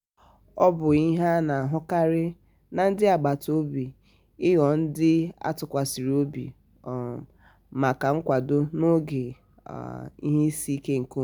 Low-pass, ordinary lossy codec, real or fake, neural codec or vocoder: none; none; real; none